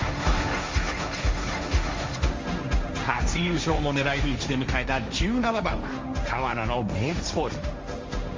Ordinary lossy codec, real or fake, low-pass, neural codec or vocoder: Opus, 32 kbps; fake; 7.2 kHz; codec, 16 kHz, 1.1 kbps, Voila-Tokenizer